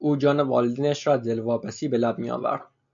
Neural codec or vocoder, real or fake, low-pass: none; real; 7.2 kHz